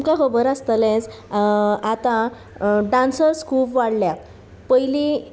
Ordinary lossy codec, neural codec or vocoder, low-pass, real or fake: none; none; none; real